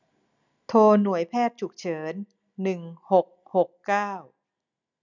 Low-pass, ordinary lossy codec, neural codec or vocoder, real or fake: 7.2 kHz; none; none; real